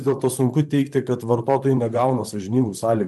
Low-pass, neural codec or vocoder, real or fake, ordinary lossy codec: 14.4 kHz; vocoder, 44.1 kHz, 128 mel bands, Pupu-Vocoder; fake; MP3, 96 kbps